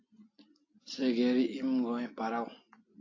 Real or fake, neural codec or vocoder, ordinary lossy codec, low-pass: real; none; AAC, 32 kbps; 7.2 kHz